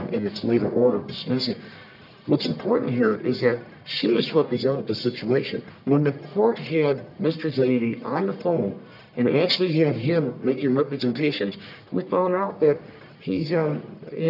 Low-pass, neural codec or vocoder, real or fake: 5.4 kHz; codec, 44.1 kHz, 1.7 kbps, Pupu-Codec; fake